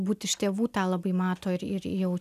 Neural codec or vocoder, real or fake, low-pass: none; real; 14.4 kHz